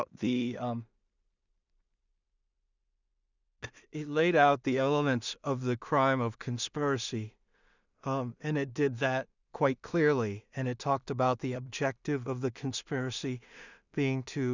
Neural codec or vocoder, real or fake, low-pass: codec, 16 kHz in and 24 kHz out, 0.4 kbps, LongCat-Audio-Codec, two codebook decoder; fake; 7.2 kHz